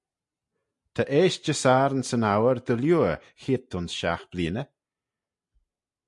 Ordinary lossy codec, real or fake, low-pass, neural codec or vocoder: MP3, 64 kbps; real; 10.8 kHz; none